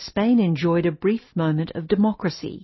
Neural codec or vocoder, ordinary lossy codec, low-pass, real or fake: none; MP3, 24 kbps; 7.2 kHz; real